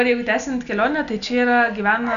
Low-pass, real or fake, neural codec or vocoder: 7.2 kHz; real; none